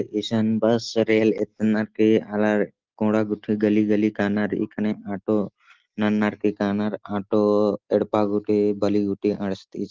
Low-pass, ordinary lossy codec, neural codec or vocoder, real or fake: 7.2 kHz; Opus, 16 kbps; none; real